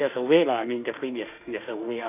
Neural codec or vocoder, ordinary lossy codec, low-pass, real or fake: autoencoder, 48 kHz, 32 numbers a frame, DAC-VAE, trained on Japanese speech; none; 3.6 kHz; fake